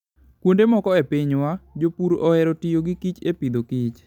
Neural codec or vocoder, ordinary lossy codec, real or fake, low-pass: none; none; real; 19.8 kHz